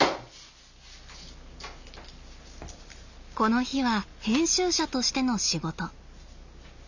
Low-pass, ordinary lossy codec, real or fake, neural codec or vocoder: 7.2 kHz; none; real; none